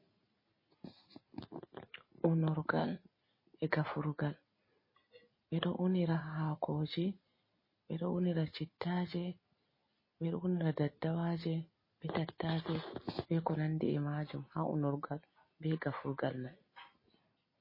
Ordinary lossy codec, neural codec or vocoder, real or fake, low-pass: MP3, 24 kbps; none; real; 5.4 kHz